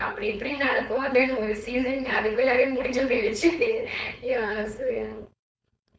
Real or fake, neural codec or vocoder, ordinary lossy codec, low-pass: fake; codec, 16 kHz, 4.8 kbps, FACodec; none; none